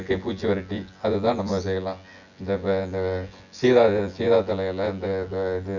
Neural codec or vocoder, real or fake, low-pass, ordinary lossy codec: vocoder, 24 kHz, 100 mel bands, Vocos; fake; 7.2 kHz; none